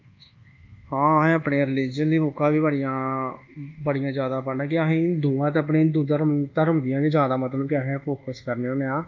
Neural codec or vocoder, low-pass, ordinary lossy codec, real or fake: codec, 24 kHz, 1.2 kbps, DualCodec; 7.2 kHz; Opus, 24 kbps; fake